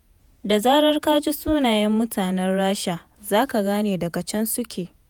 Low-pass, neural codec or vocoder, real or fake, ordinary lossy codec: none; vocoder, 48 kHz, 128 mel bands, Vocos; fake; none